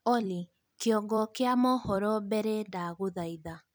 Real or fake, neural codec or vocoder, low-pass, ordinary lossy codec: fake; vocoder, 44.1 kHz, 128 mel bands every 256 samples, BigVGAN v2; none; none